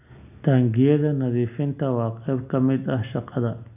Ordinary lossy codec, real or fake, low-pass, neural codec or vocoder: none; real; 3.6 kHz; none